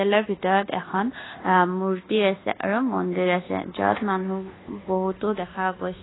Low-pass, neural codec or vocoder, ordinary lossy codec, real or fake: 7.2 kHz; codec, 24 kHz, 1.2 kbps, DualCodec; AAC, 16 kbps; fake